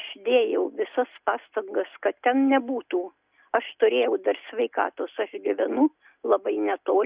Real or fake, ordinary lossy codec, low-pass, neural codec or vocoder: real; Opus, 64 kbps; 3.6 kHz; none